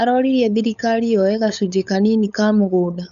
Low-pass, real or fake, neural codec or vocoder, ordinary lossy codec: 7.2 kHz; fake; codec, 16 kHz, 8 kbps, FunCodec, trained on LibriTTS, 25 frames a second; none